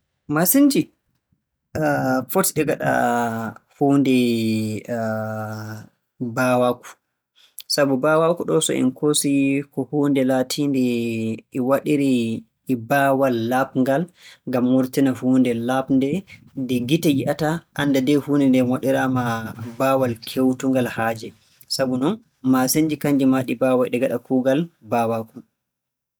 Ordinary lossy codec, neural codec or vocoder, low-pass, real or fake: none; none; none; real